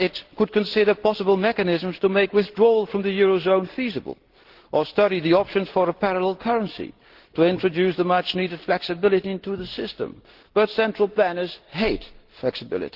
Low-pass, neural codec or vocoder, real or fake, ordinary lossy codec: 5.4 kHz; none; real; Opus, 16 kbps